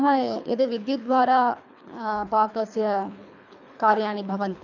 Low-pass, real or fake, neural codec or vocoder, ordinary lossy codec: 7.2 kHz; fake; codec, 24 kHz, 3 kbps, HILCodec; none